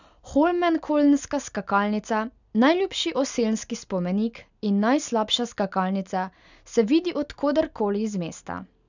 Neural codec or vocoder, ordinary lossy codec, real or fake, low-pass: none; none; real; 7.2 kHz